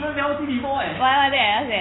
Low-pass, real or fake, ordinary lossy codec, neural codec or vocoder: 7.2 kHz; real; AAC, 16 kbps; none